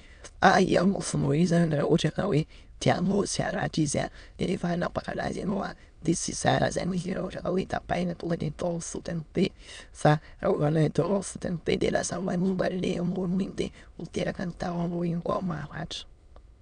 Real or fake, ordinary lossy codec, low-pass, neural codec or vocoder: fake; none; 9.9 kHz; autoencoder, 22.05 kHz, a latent of 192 numbers a frame, VITS, trained on many speakers